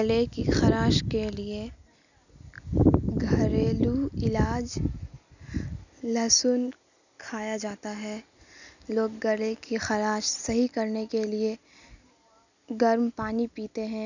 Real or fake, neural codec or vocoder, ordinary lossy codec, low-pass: real; none; none; 7.2 kHz